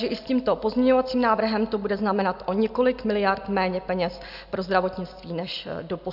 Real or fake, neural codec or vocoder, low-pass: real; none; 5.4 kHz